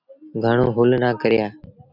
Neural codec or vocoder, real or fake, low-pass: none; real; 5.4 kHz